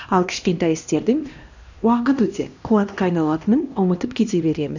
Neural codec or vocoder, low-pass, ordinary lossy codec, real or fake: codec, 16 kHz, 1 kbps, X-Codec, HuBERT features, trained on LibriSpeech; 7.2 kHz; none; fake